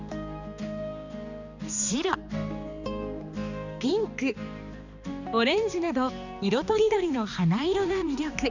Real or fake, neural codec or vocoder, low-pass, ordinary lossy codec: fake; codec, 16 kHz, 2 kbps, X-Codec, HuBERT features, trained on balanced general audio; 7.2 kHz; none